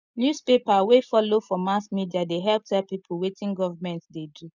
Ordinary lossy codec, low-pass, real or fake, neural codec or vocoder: none; 7.2 kHz; real; none